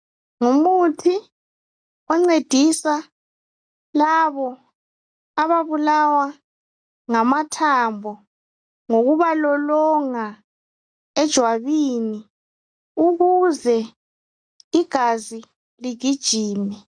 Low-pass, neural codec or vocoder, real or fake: 9.9 kHz; none; real